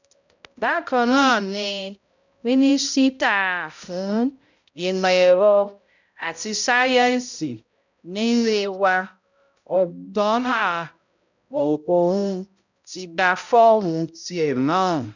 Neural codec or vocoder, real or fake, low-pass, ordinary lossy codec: codec, 16 kHz, 0.5 kbps, X-Codec, HuBERT features, trained on balanced general audio; fake; 7.2 kHz; none